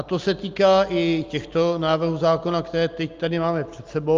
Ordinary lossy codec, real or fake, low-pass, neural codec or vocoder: Opus, 24 kbps; real; 7.2 kHz; none